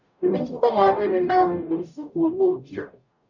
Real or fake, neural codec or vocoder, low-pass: fake; codec, 44.1 kHz, 0.9 kbps, DAC; 7.2 kHz